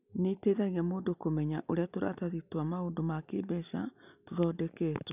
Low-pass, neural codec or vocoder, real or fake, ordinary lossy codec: 3.6 kHz; none; real; none